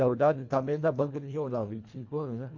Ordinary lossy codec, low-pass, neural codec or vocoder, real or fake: MP3, 48 kbps; 7.2 kHz; codec, 24 kHz, 1.5 kbps, HILCodec; fake